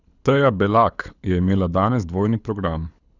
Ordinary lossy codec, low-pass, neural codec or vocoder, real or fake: none; 7.2 kHz; codec, 24 kHz, 6 kbps, HILCodec; fake